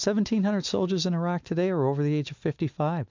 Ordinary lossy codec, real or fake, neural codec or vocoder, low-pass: MP3, 64 kbps; real; none; 7.2 kHz